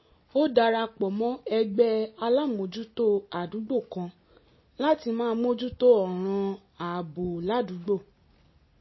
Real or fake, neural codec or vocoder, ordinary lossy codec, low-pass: real; none; MP3, 24 kbps; 7.2 kHz